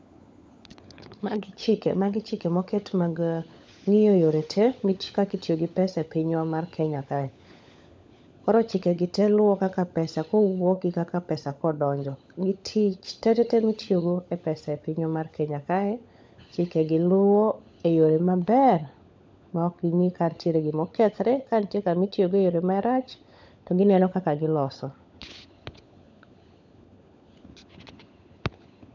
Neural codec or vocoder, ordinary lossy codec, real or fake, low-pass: codec, 16 kHz, 16 kbps, FunCodec, trained on LibriTTS, 50 frames a second; none; fake; none